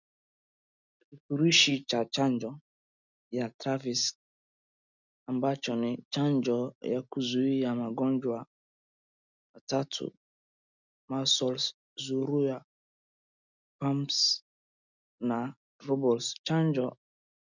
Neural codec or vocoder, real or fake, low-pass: none; real; 7.2 kHz